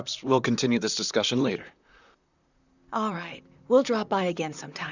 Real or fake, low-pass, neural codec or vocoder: fake; 7.2 kHz; vocoder, 44.1 kHz, 128 mel bands, Pupu-Vocoder